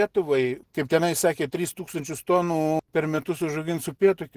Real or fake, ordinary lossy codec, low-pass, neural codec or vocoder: real; Opus, 16 kbps; 14.4 kHz; none